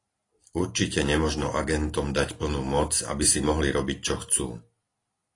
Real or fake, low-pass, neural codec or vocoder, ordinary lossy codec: real; 10.8 kHz; none; AAC, 32 kbps